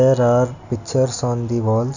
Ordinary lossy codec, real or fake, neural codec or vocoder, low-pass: none; real; none; 7.2 kHz